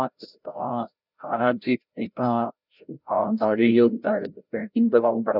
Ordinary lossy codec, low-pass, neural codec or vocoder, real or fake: none; 5.4 kHz; codec, 16 kHz, 0.5 kbps, FreqCodec, larger model; fake